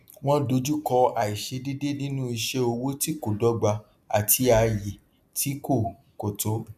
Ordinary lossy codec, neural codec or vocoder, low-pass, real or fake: none; none; 14.4 kHz; real